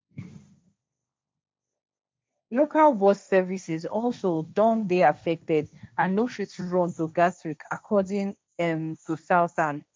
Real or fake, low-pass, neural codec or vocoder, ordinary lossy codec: fake; none; codec, 16 kHz, 1.1 kbps, Voila-Tokenizer; none